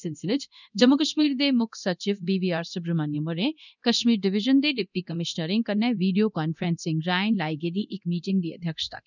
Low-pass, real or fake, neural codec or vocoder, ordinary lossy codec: 7.2 kHz; fake; codec, 24 kHz, 0.9 kbps, DualCodec; none